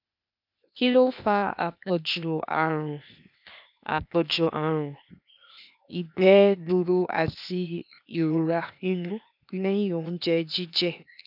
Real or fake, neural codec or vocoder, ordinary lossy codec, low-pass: fake; codec, 16 kHz, 0.8 kbps, ZipCodec; none; 5.4 kHz